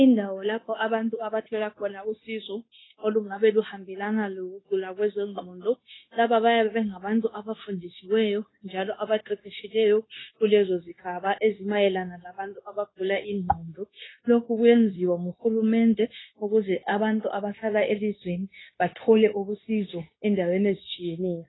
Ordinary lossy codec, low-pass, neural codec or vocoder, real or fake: AAC, 16 kbps; 7.2 kHz; codec, 16 kHz, 0.9 kbps, LongCat-Audio-Codec; fake